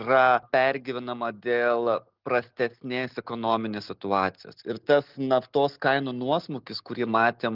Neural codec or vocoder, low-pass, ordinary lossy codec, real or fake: none; 5.4 kHz; Opus, 32 kbps; real